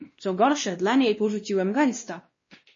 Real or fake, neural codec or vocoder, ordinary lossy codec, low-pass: fake; codec, 16 kHz, 1 kbps, X-Codec, WavLM features, trained on Multilingual LibriSpeech; MP3, 32 kbps; 7.2 kHz